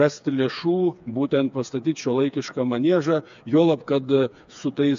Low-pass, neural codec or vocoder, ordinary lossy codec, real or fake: 7.2 kHz; codec, 16 kHz, 4 kbps, FreqCodec, smaller model; AAC, 64 kbps; fake